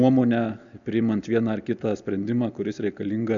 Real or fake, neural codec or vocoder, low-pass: real; none; 7.2 kHz